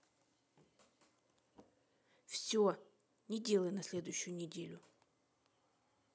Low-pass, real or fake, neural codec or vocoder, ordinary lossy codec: none; real; none; none